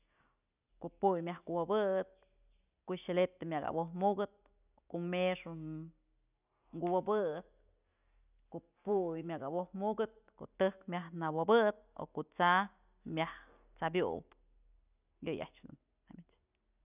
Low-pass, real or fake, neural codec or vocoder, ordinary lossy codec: 3.6 kHz; real; none; none